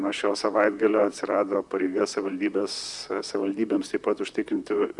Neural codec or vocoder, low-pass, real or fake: vocoder, 44.1 kHz, 128 mel bands, Pupu-Vocoder; 10.8 kHz; fake